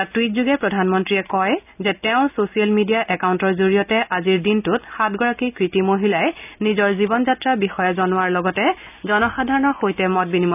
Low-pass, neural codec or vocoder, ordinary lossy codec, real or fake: 3.6 kHz; none; none; real